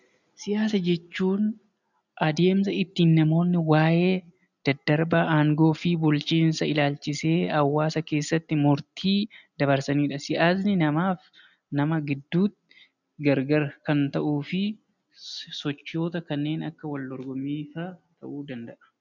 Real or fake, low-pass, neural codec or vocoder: real; 7.2 kHz; none